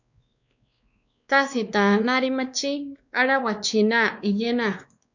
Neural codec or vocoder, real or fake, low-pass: codec, 16 kHz, 2 kbps, X-Codec, WavLM features, trained on Multilingual LibriSpeech; fake; 7.2 kHz